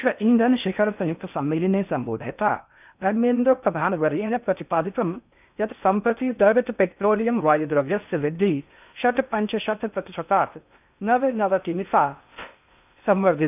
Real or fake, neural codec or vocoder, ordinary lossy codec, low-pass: fake; codec, 16 kHz in and 24 kHz out, 0.6 kbps, FocalCodec, streaming, 4096 codes; none; 3.6 kHz